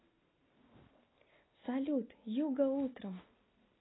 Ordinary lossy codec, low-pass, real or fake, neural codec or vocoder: AAC, 16 kbps; 7.2 kHz; real; none